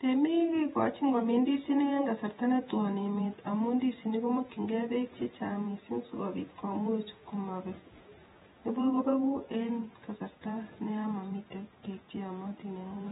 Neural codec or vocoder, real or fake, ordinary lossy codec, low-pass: vocoder, 48 kHz, 128 mel bands, Vocos; fake; AAC, 16 kbps; 19.8 kHz